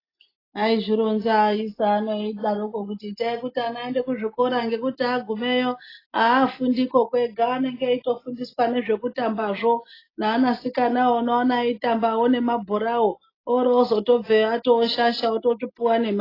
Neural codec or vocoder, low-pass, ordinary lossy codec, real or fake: none; 5.4 kHz; AAC, 24 kbps; real